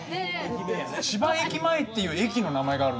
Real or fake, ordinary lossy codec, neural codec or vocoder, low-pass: real; none; none; none